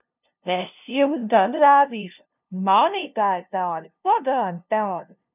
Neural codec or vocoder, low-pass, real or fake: codec, 16 kHz, 0.5 kbps, FunCodec, trained on LibriTTS, 25 frames a second; 3.6 kHz; fake